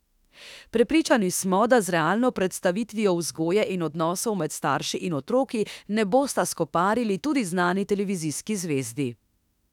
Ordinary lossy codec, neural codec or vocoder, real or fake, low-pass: none; autoencoder, 48 kHz, 32 numbers a frame, DAC-VAE, trained on Japanese speech; fake; 19.8 kHz